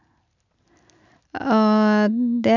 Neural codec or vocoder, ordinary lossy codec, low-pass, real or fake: none; none; 7.2 kHz; real